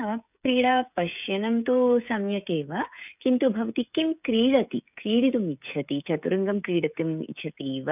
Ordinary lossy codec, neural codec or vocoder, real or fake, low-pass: none; codec, 16 kHz, 16 kbps, FreqCodec, smaller model; fake; 3.6 kHz